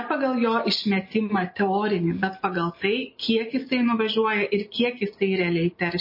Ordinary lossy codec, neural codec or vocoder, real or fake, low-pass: MP3, 24 kbps; none; real; 5.4 kHz